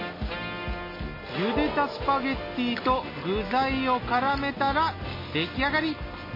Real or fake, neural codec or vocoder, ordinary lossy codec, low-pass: real; none; MP3, 24 kbps; 5.4 kHz